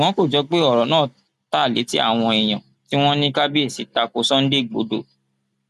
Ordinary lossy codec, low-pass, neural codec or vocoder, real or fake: none; 14.4 kHz; none; real